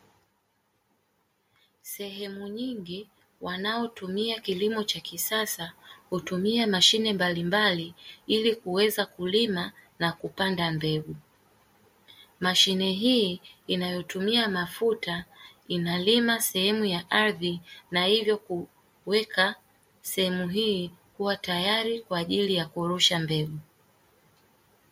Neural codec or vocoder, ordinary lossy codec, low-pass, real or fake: none; MP3, 64 kbps; 19.8 kHz; real